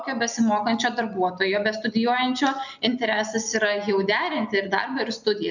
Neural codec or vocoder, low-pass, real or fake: none; 7.2 kHz; real